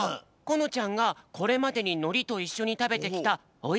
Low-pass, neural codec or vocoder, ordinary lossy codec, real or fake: none; none; none; real